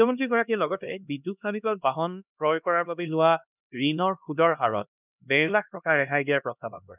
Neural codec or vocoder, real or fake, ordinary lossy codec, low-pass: codec, 16 kHz, 1 kbps, X-Codec, HuBERT features, trained on LibriSpeech; fake; none; 3.6 kHz